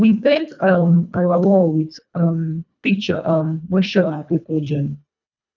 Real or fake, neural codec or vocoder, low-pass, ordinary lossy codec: fake; codec, 24 kHz, 1.5 kbps, HILCodec; 7.2 kHz; none